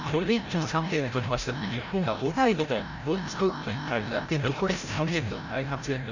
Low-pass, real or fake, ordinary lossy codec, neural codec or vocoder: 7.2 kHz; fake; none; codec, 16 kHz, 0.5 kbps, FreqCodec, larger model